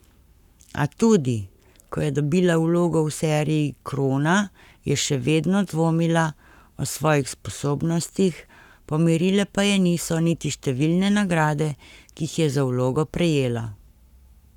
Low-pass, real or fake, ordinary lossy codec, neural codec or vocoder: 19.8 kHz; fake; none; codec, 44.1 kHz, 7.8 kbps, Pupu-Codec